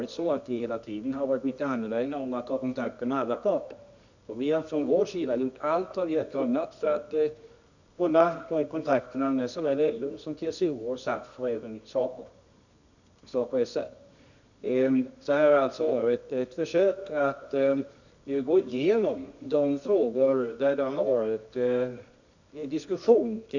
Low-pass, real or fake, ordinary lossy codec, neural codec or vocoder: 7.2 kHz; fake; none; codec, 24 kHz, 0.9 kbps, WavTokenizer, medium music audio release